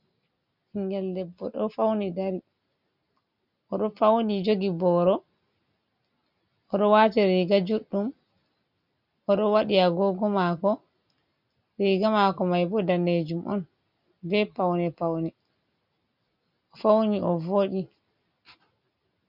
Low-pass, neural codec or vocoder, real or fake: 5.4 kHz; none; real